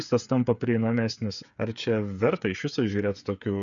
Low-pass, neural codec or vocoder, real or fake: 7.2 kHz; codec, 16 kHz, 8 kbps, FreqCodec, smaller model; fake